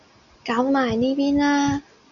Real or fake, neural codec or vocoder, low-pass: real; none; 7.2 kHz